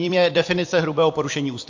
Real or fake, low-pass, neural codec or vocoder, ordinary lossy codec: fake; 7.2 kHz; vocoder, 44.1 kHz, 128 mel bands every 256 samples, BigVGAN v2; AAC, 48 kbps